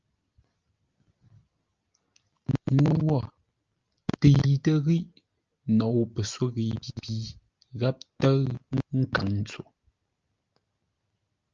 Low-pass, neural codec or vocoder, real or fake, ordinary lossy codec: 7.2 kHz; none; real; Opus, 24 kbps